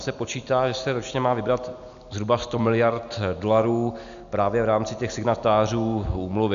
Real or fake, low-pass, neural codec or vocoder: real; 7.2 kHz; none